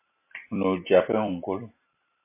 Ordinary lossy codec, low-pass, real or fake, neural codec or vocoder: MP3, 24 kbps; 3.6 kHz; fake; vocoder, 44.1 kHz, 128 mel bands every 256 samples, BigVGAN v2